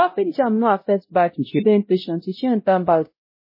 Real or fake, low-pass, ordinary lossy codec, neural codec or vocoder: fake; 5.4 kHz; MP3, 24 kbps; codec, 16 kHz, 0.5 kbps, X-Codec, WavLM features, trained on Multilingual LibriSpeech